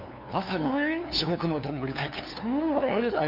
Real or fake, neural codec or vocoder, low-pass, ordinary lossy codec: fake; codec, 16 kHz, 2 kbps, FunCodec, trained on LibriTTS, 25 frames a second; 5.4 kHz; none